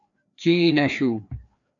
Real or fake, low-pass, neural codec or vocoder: fake; 7.2 kHz; codec, 16 kHz, 2 kbps, FreqCodec, larger model